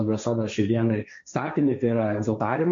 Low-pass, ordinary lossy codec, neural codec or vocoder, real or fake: 7.2 kHz; MP3, 48 kbps; codec, 16 kHz, 1.1 kbps, Voila-Tokenizer; fake